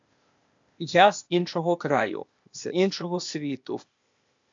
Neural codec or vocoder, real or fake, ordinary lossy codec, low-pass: codec, 16 kHz, 0.8 kbps, ZipCodec; fake; MP3, 64 kbps; 7.2 kHz